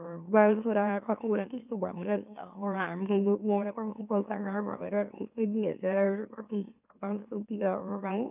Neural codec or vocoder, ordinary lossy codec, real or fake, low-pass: autoencoder, 44.1 kHz, a latent of 192 numbers a frame, MeloTTS; none; fake; 3.6 kHz